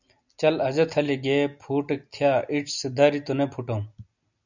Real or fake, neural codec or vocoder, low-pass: real; none; 7.2 kHz